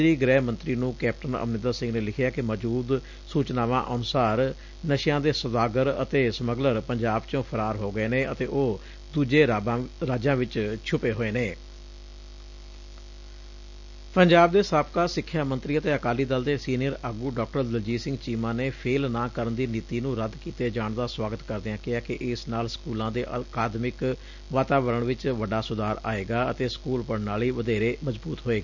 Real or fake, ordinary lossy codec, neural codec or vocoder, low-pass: real; none; none; 7.2 kHz